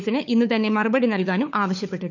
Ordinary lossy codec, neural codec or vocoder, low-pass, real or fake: none; codec, 16 kHz, 4 kbps, FunCodec, trained on LibriTTS, 50 frames a second; 7.2 kHz; fake